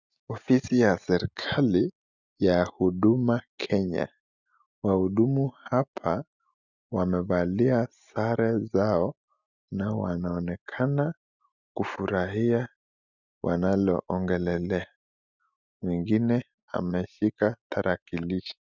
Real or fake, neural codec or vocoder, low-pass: real; none; 7.2 kHz